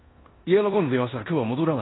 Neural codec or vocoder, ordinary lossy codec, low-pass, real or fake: codec, 16 kHz in and 24 kHz out, 0.9 kbps, LongCat-Audio-Codec, four codebook decoder; AAC, 16 kbps; 7.2 kHz; fake